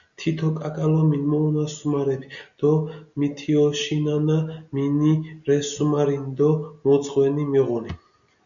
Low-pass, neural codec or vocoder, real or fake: 7.2 kHz; none; real